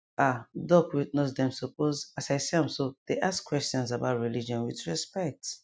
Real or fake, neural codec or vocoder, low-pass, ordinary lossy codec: real; none; none; none